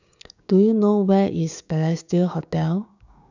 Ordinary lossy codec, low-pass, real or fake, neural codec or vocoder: none; 7.2 kHz; fake; codec, 16 kHz, 6 kbps, DAC